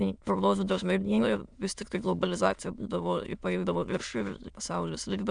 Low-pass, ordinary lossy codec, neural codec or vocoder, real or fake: 9.9 kHz; Opus, 64 kbps; autoencoder, 22.05 kHz, a latent of 192 numbers a frame, VITS, trained on many speakers; fake